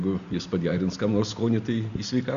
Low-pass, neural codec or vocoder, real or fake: 7.2 kHz; none; real